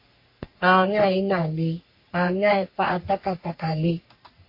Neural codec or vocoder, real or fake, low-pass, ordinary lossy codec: codec, 44.1 kHz, 3.4 kbps, Pupu-Codec; fake; 5.4 kHz; MP3, 32 kbps